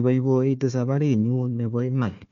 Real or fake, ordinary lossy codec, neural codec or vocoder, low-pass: fake; none; codec, 16 kHz, 1 kbps, FunCodec, trained on Chinese and English, 50 frames a second; 7.2 kHz